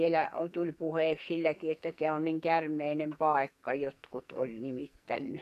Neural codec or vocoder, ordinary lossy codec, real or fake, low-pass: codec, 44.1 kHz, 2.6 kbps, SNAC; none; fake; 14.4 kHz